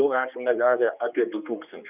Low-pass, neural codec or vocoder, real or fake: 3.6 kHz; codec, 16 kHz, 2 kbps, X-Codec, HuBERT features, trained on general audio; fake